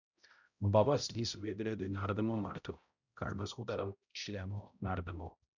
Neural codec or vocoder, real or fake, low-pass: codec, 16 kHz, 0.5 kbps, X-Codec, HuBERT features, trained on balanced general audio; fake; 7.2 kHz